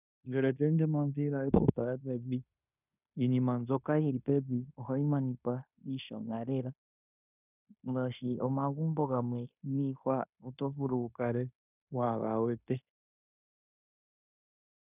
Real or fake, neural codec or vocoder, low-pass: fake; codec, 16 kHz in and 24 kHz out, 0.9 kbps, LongCat-Audio-Codec, fine tuned four codebook decoder; 3.6 kHz